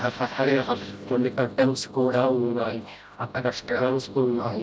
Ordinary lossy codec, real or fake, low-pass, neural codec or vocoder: none; fake; none; codec, 16 kHz, 0.5 kbps, FreqCodec, smaller model